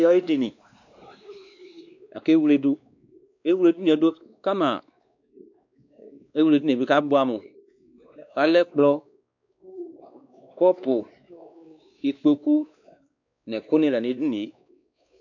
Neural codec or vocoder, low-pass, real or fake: codec, 16 kHz, 2 kbps, X-Codec, WavLM features, trained on Multilingual LibriSpeech; 7.2 kHz; fake